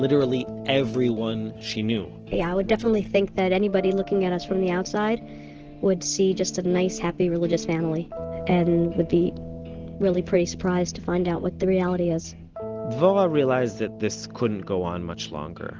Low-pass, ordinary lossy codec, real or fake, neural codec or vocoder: 7.2 kHz; Opus, 16 kbps; real; none